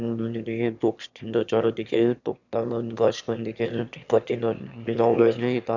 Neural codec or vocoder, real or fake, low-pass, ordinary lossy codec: autoencoder, 22.05 kHz, a latent of 192 numbers a frame, VITS, trained on one speaker; fake; 7.2 kHz; none